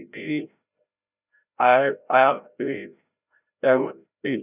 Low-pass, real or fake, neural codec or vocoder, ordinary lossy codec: 3.6 kHz; fake; codec, 16 kHz, 0.5 kbps, FreqCodec, larger model; none